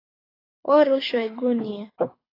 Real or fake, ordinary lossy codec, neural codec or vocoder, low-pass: fake; AAC, 24 kbps; codec, 24 kHz, 6 kbps, HILCodec; 5.4 kHz